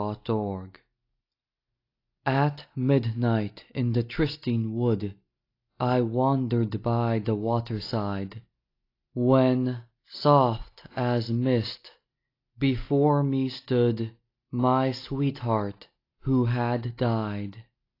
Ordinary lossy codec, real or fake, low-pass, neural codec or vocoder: AAC, 32 kbps; real; 5.4 kHz; none